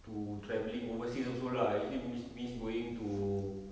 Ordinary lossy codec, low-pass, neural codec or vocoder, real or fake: none; none; none; real